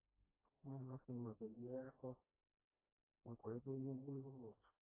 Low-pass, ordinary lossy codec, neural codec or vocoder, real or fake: 3.6 kHz; AAC, 16 kbps; codec, 16 kHz, 1 kbps, FreqCodec, smaller model; fake